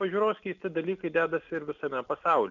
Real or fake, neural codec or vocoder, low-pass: real; none; 7.2 kHz